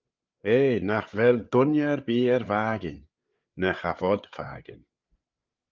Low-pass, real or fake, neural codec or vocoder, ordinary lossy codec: 7.2 kHz; fake; codec, 16 kHz, 8 kbps, FreqCodec, larger model; Opus, 32 kbps